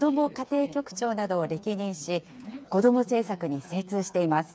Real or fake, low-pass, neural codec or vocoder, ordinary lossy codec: fake; none; codec, 16 kHz, 4 kbps, FreqCodec, smaller model; none